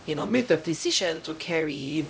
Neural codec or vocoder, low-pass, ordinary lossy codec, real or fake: codec, 16 kHz, 0.5 kbps, X-Codec, HuBERT features, trained on LibriSpeech; none; none; fake